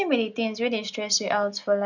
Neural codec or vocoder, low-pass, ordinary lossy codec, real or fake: none; 7.2 kHz; none; real